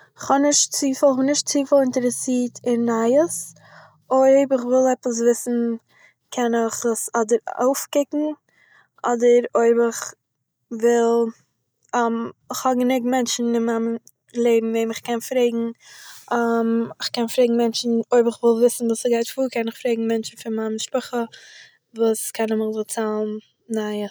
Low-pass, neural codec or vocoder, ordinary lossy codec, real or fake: none; none; none; real